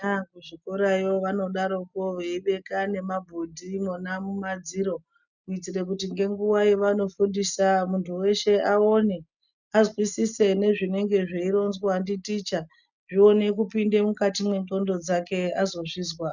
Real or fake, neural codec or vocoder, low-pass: real; none; 7.2 kHz